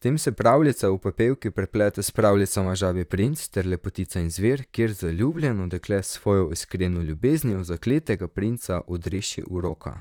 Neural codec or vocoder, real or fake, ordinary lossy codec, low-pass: vocoder, 44.1 kHz, 128 mel bands, Pupu-Vocoder; fake; none; 19.8 kHz